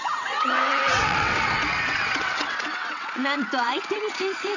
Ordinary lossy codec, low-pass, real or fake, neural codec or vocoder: none; 7.2 kHz; fake; vocoder, 22.05 kHz, 80 mel bands, WaveNeXt